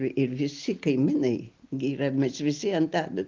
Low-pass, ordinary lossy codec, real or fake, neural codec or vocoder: 7.2 kHz; Opus, 32 kbps; real; none